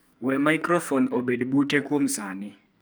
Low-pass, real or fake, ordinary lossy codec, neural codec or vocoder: none; fake; none; codec, 44.1 kHz, 2.6 kbps, SNAC